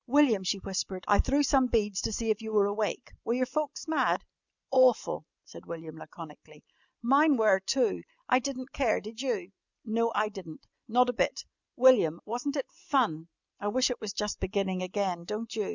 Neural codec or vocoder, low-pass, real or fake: none; 7.2 kHz; real